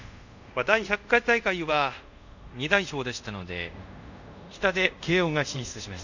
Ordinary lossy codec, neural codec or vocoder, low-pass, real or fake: none; codec, 24 kHz, 0.5 kbps, DualCodec; 7.2 kHz; fake